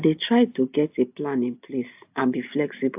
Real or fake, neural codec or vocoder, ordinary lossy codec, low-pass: real; none; AAC, 32 kbps; 3.6 kHz